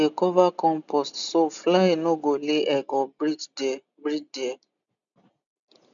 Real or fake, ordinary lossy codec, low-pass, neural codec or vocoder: real; none; 7.2 kHz; none